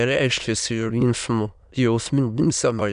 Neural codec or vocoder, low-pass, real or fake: autoencoder, 22.05 kHz, a latent of 192 numbers a frame, VITS, trained on many speakers; 9.9 kHz; fake